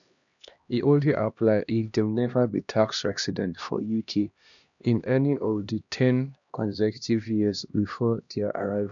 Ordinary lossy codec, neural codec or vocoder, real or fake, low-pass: none; codec, 16 kHz, 1 kbps, X-Codec, HuBERT features, trained on LibriSpeech; fake; 7.2 kHz